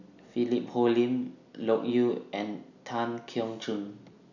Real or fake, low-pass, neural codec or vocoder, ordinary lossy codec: real; 7.2 kHz; none; none